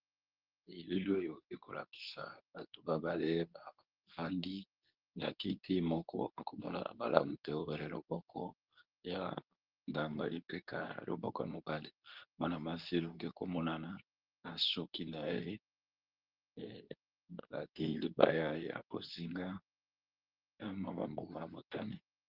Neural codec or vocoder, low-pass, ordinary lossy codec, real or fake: codec, 24 kHz, 0.9 kbps, WavTokenizer, medium speech release version 2; 5.4 kHz; Opus, 32 kbps; fake